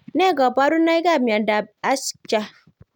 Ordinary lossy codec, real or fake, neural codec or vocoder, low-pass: none; real; none; 19.8 kHz